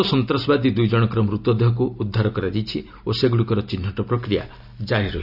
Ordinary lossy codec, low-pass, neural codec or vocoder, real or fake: none; 5.4 kHz; none; real